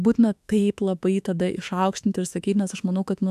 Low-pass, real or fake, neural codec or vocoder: 14.4 kHz; fake; autoencoder, 48 kHz, 32 numbers a frame, DAC-VAE, trained on Japanese speech